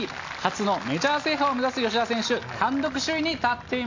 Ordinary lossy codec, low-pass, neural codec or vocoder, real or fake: AAC, 48 kbps; 7.2 kHz; none; real